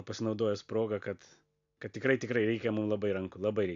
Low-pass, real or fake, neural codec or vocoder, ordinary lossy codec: 7.2 kHz; real; none; AAC, 64 kbps